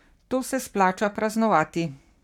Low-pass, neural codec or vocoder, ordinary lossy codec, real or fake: 19.8 kHz; codec, 44.1 kHz, 7.8 kbps, Pupu-Codec; none; fake